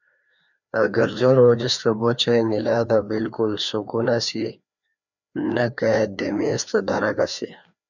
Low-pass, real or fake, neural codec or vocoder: 7.2 kHz; fake; codec, 16 kHz, 2 kbps, FreqCodec, larger model